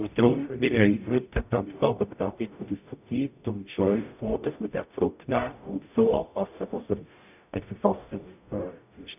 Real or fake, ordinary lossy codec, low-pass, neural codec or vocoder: fake; none; 3.6 kHz; codec, 44.1 kHz, 0.9 kbps, DAC